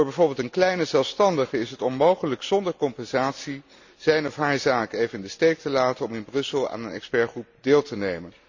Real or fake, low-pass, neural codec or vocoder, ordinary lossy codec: real; 7.2 kHz; none; Opus, 64 kbps